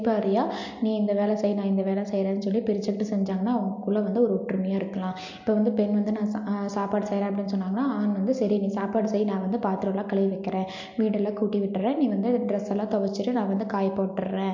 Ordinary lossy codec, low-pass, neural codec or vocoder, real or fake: MP3, 48 kbps; 7.2 kHz; none; real